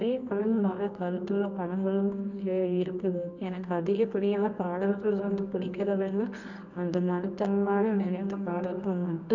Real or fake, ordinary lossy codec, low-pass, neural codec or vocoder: fake; none; 7.2 kHz; codec, 24 kHz, 0.9 kbps, WavTokenizer, medium music audio release